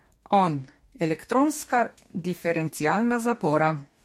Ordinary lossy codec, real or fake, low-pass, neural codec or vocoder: MP3, 64 kbps; fake; 19.8 kHz; codec, 44.1 kHz, 2.6 kbps, DAC